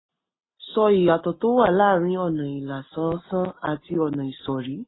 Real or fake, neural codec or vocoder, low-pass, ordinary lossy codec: real; none; 7.2 kHz; AAC, 16 kbps